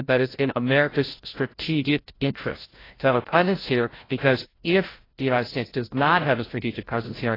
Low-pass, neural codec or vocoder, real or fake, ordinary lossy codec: 5.4 kHz; codec, 16 kHz, 0.5 kbps, FreqCodec, larger model; fake; AAC, 24 kbps